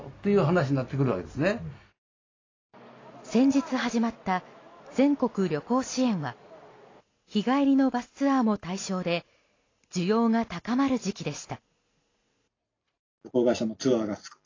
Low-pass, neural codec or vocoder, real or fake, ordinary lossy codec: 7.2 kHz; none; real; AAC, 32 kbps